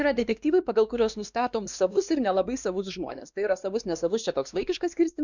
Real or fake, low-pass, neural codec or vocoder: fake; 7.2 kHz; codec, 16 kHz, 2 kbps, X-Codec, WavLM features, trained on Multilingual LibriSpeech